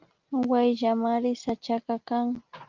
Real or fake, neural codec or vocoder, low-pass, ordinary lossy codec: real; none; 7.2 kHz; Opus, 32 kbps